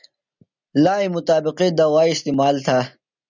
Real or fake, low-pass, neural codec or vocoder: real; 7.2 kHz; none